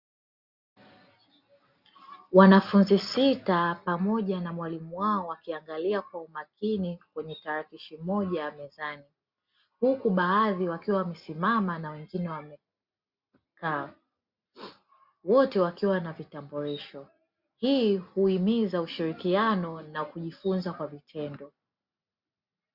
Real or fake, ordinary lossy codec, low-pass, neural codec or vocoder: real; Opus, 64 kbps; 5.4 kHz; none